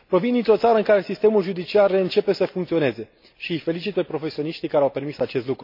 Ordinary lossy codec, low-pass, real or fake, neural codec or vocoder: MP3, 32 kbps; 5.4 kHz; real; none